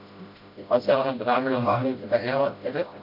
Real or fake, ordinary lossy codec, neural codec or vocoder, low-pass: fake; MP3, 48 kbps; codec, 16 kHz, 0.5 kbps, FreqCodec, smaller model; 5.4 kHz